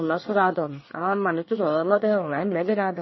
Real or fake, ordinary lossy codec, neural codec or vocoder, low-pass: fake; MP3, 24 kbps; codec, 24 kHz, 1 kbps, SNAC; 7.2 kHz